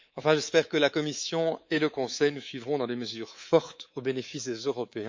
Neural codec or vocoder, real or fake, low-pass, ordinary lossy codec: codec, 24 kHz, 3.1 kbps, DualCodec; fake; 7.2 kHz; MP3, 48 kbps